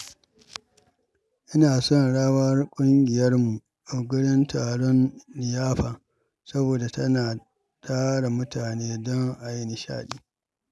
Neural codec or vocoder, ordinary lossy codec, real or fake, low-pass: none; none; real; none